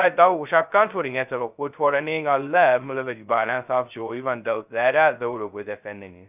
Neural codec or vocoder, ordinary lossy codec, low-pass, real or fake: codec, 16 kHz, 0.2 kbps, FocalCodec; none; 3.6 kHz; fake